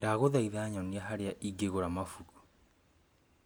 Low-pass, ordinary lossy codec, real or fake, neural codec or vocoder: none; none; real; none